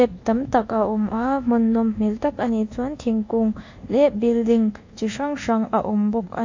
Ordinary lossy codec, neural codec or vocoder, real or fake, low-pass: AAC, 48 kbps; codec, 24 kHz, 1.2 kbps, DualCodec; fake; 7.2 kHz